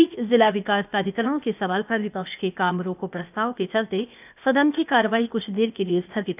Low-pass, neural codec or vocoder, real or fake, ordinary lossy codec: 3.6 kHz; codec, 16 kHz, 0.8 kbps, ZipCodec; fake; none